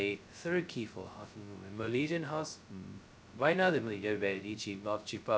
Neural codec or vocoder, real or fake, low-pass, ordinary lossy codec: codec, 16 kHz, 0.2 kbps, FocalCodec; fake; none; none